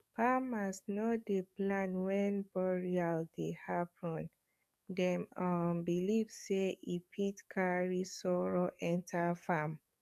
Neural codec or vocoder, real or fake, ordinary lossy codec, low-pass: codec, 44.1 kHz, 7.8 kbps, DAC; fake; none; 14.4 kHz